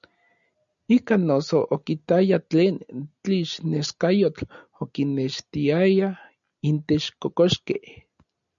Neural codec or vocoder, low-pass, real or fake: none; 7.2 kHz; real